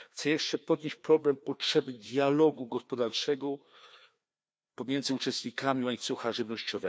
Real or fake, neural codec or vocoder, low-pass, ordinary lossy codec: fake; codec, 16 kHz, 2 kbps, FreqCodec, larger model; none; none